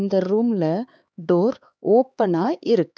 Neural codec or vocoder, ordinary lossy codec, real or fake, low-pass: codec, 16 kHz, 4 kbps, X-Codec, HuBERT features, trained on LibriSpeech; none; fake; none